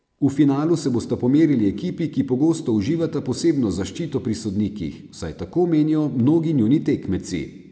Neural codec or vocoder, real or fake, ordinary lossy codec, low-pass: none; real; none; none